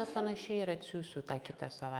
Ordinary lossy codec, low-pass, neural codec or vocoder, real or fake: Opus, 32 kbps; 19.8 kHz; autoencoder, 48 kHz, 128 numbers a frame, DAC-VAE, trained on Japanese speech; fake